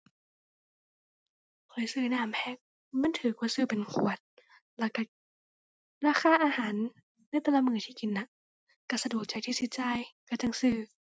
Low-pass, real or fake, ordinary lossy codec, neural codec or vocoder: none; real; none; none